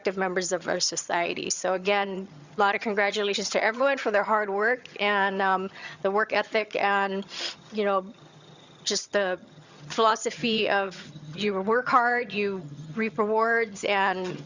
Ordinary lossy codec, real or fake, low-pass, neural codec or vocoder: Opus, 64 kbps; fake; 7.2 kHz; vocoder, 22.05 kHz, 80 mel bands, HiFi-GAN